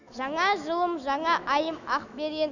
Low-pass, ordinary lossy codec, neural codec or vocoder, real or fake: 7.2 kHz; none; autoencoder, 48 kHz, 128 numbers a frame, DAC-VAE, trained on Japanese speech; fake